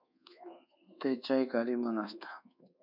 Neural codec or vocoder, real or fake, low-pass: codec, 24 kHz, 1.2 kbps, DualCodec; fake; 5.4 kHz